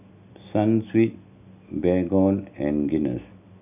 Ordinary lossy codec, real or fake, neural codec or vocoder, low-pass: none; real; none; 3.6 kHz